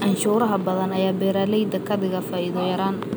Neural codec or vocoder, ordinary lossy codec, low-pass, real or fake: none; none; none; real